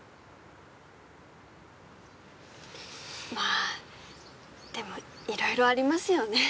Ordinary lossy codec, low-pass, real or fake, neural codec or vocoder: none; none; real; none